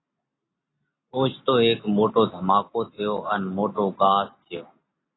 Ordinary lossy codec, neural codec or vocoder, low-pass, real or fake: AAC, 16 kbps; none; 7.2 kHz; real